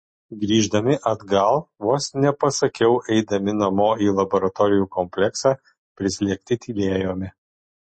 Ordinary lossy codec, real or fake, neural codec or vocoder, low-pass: MP3, 32 kbps; real; none; 10.8 kHz